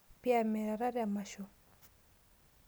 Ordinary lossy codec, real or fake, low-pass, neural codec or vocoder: none; real; none; none